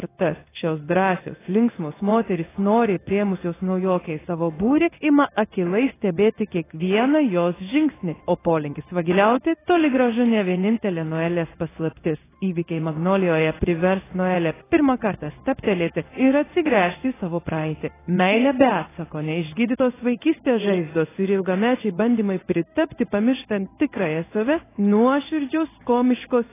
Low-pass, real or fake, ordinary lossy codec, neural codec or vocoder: 3.6 kHz; fake; AAC, 16 kbps; codec, 16 kHz in and 24 kHz out, 1 kbps, XY-Tokenizer